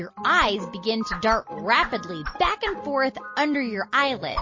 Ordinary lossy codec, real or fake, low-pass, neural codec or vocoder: MP3, 32 kbps; real; 7.2 kHz; none